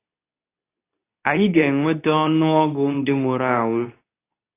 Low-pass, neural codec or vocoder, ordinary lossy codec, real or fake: 3.6 kHz; codec, 24 kHz, 0.9 kbps, WavTokenizer, medium speech release version 2; AAC, 24 kbps; fake